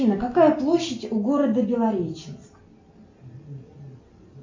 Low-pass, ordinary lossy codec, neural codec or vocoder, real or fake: 7.2 kHz; MP3, 48 kbps; none; real